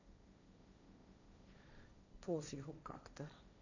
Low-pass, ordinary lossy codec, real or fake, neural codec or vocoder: 7.2 kHz; none; fake; codec, 16 kHz, 1.1 kbps, Voila-Tokenizer